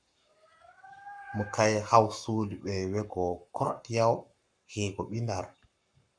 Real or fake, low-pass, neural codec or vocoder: fake; 9.9 kHz; codec, 44.1 kHz, 7.8 kbps, Pupu-Codec